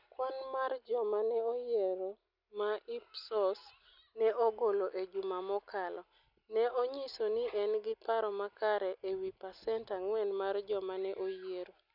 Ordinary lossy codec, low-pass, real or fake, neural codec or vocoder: none; 5.4 kHz; real; none